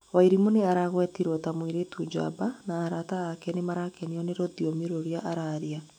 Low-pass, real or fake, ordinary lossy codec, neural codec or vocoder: 19.8 kHz; fake; none; autoencoder, 48 kHz, 128 numbers a frame, DAC-VAE, trained on Japanese speech